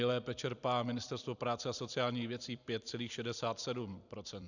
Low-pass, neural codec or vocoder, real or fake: 7.2 kHz; vocoder, 44.1 kHz, 128 mel bands every 256 samples, BigVGAN v2; fake